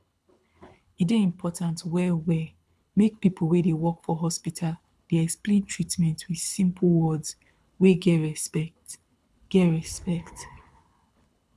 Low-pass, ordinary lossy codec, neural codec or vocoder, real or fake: none; none; codec, 24 kHz, 6 kbps, HILCodec; fake